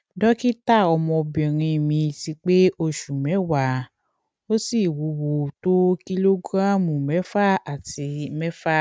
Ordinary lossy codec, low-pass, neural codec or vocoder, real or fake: none; none; none; real